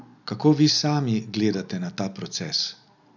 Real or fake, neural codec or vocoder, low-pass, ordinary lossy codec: real; none; 7.2 kHz; none